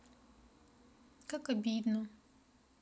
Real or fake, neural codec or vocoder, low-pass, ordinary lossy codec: real; none; none; none